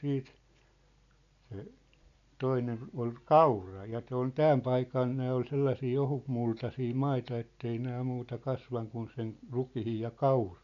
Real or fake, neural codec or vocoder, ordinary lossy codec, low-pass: real; none; none; 7.2 kHz